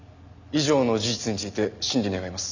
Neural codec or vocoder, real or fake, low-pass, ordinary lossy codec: none; real; 7.2 kHz; none